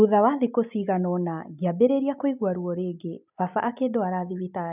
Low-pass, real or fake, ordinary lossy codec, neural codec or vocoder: 3.6 kHz; real; none; none